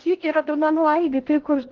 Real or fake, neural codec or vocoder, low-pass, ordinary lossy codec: fake; codec, 16 kHz in and 24 kHz out, 0.6 kbps, FocalCodec, streaming, 2048 codes; 7.2 kHz; Opus, 16 kbps